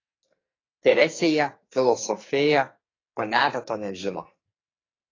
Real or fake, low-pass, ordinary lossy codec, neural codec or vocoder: fake; 7.2 kHz; AAC, 32 kbps; codec, 44.1 kHz, 2.6 kbps, SNAC